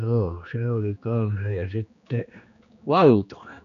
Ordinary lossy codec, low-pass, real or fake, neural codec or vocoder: none; 7.2 kHz; fake; codec, 16 kHz, 2 kbps, X-Codec, HuBERT features, trained on general audio